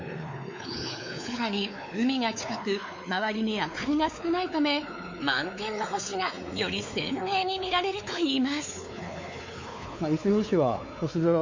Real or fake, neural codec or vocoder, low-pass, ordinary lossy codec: fake; codec, 16 kHz, 4 kbps, X-Codec, WavLM features, trained on Multilingual LibriSpeech; 7.2 kHz; MP3, 48 kbps